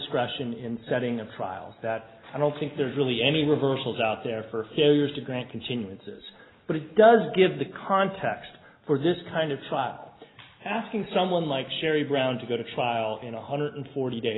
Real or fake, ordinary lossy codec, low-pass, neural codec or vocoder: real; AAC, 16 kbps; 7.2 kHz; none